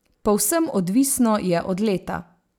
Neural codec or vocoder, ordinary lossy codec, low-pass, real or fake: none; none; none; real